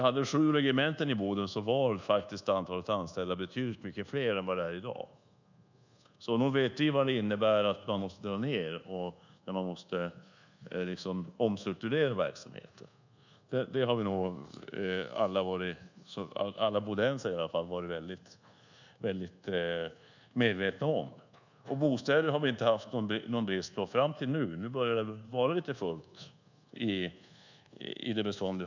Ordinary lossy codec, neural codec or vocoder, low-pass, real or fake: none; codec, 24 kHz, 1.2 kbps, DualCodec; 7.2 kHz; fake